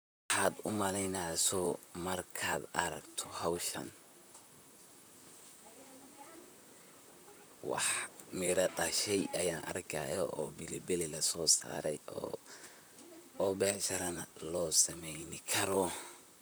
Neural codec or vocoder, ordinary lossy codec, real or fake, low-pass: vocoder, 44.1 kHz, 128 mel bands, Pupu-Vocoder; none; fake; none